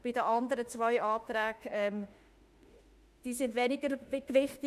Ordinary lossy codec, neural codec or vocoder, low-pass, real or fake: Opus, 64 kbps; autoencoder, 48 kHz, 32 numbers a frame, DAC-VAE, trained on Japanese speech; 14.4 kHz; fake